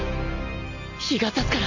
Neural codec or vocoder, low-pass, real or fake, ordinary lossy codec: none; 7.2 kHz; real; none